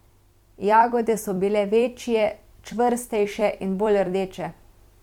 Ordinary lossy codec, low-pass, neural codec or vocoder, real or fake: MP3, 96 kbps; 19.8 kHz; vocoder, 44.1 kHz, 128 mel bands every 256 samples, BigVGAN v2; fake